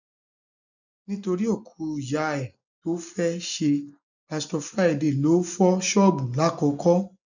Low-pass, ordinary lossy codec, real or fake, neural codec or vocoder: 7.2 kHz; none; real; none